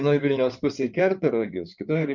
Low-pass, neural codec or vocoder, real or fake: 7.2 kHz; codec, 16 kHz in and 24 kHz out, 2.2 kbps, FireRedTTS-2 codec; fake